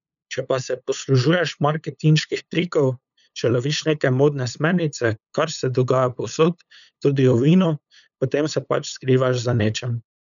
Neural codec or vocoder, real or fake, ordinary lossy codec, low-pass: codec, 16 kHz, 8 kbps, FunCodec, trained on LibriTTS, 25 frames a second; fake; none; 7.2 kHz